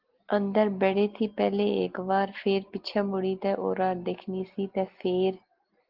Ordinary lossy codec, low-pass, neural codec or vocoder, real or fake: Opus, 16 kbps; 5.4 kHz; none; real